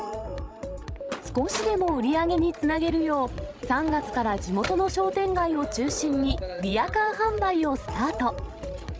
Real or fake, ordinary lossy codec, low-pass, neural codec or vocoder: fake; none; none; codec, 16 kHz, 16 kbps, FreqCodec, larger model